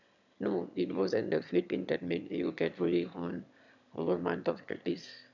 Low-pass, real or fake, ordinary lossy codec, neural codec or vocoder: 7.2 kHz; fake; none; autoencoder, 22.05 kHz, a latent of 192 numbers a frame, VITS, trained on one speaker